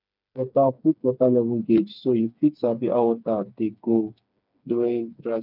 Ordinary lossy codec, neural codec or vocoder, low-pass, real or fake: none; codec, 16 kHz, 4 kbps, FreqCodec, smaller model; 5.4 kHz; fake